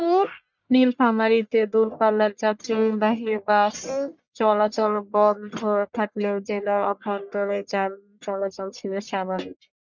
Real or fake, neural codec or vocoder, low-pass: fake; codec, 44.1 kHz, 1.7 kbps, Pupu-Codec; 7.2 kHz